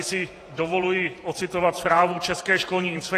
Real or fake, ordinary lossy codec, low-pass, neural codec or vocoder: fake; AAC, 48 kbps; 14.4 kHz; vocoder, 48 kHz, 128 mel bands, Vocos